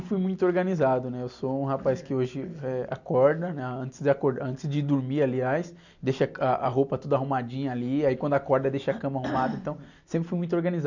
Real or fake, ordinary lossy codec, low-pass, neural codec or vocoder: real; none; 7.2 kHz; none